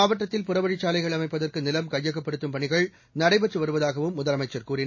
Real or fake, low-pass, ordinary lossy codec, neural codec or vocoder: real; 7.2 kHz; MP3, 32 kbps; none